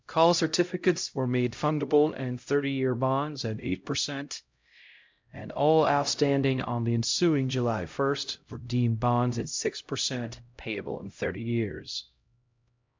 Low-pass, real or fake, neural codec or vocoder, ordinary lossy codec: 7.2 kHz; fake; codec, 16 kHz, 0.5 kbps, X-Codec, HuBERT features, trained on LibriSpeech; MP3, 64 kbps